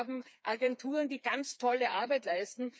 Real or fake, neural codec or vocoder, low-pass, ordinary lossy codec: fake; codec, 16 kHz, 4 kbps, FreqCodec, smaller model; none; none